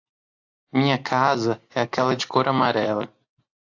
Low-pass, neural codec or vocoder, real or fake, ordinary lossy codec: 7.2 kHz; none; real; AAC, 48 kbps